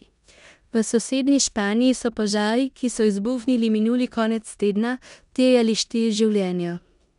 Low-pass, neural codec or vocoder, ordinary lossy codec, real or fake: 10.8 kHz; codec, 16 kHz in and 24 kHz out, 0.9 kbps, LongCat-Audio-Codec, four codebook decoder; none; fake